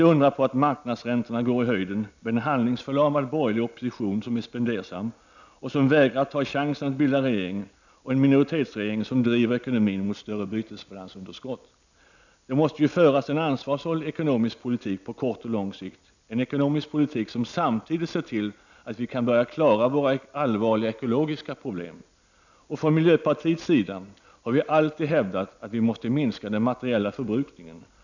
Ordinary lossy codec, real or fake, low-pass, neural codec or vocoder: none; real; 7.2 kHz; none